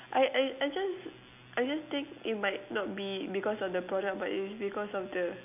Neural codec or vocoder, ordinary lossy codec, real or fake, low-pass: none; none; real; 3.6 kHz